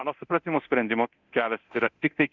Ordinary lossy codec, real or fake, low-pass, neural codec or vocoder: Opus, 64 kbps; fake; 7.2 kHz; codec, 24 kHz, 0.9 kbps, DualCodec